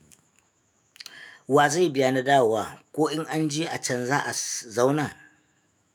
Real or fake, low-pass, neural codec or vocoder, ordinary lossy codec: fake; none; autoencoder, 48 kHz, 128 numbers a frame, DAC-VAE, trained on Japanese speech; none